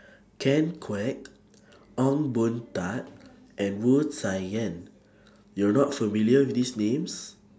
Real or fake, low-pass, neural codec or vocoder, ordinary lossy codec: real; none; none; none